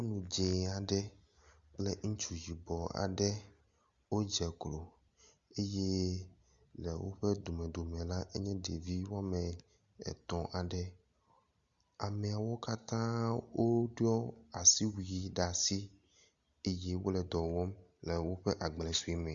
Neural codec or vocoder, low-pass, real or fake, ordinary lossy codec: none; 7.2 kHz; real; Opus, 64 kbps